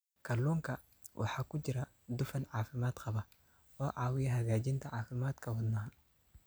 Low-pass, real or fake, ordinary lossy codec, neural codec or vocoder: none; real; none; none